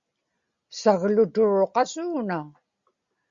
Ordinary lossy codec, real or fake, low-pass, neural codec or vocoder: Opus, 64 kbps; real; 7.2 kHz; none